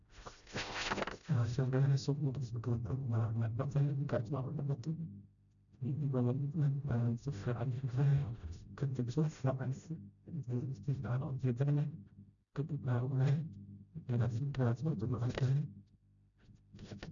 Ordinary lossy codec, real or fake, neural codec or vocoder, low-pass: none; fake; codec, 16 kHz, 0.5 kbps, FreqCodec, smaller model; 7.2 kHz